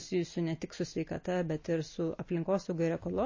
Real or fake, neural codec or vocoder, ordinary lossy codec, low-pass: real; none; MP3, 32 kbps; 7.2 kHz